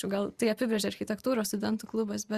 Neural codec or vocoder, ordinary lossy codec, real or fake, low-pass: vocoder, 44.1 kHz, 128 mel bands every 512 samples, BigVGAN v2; Opus, 64 kbps; fake; 14.4 kHz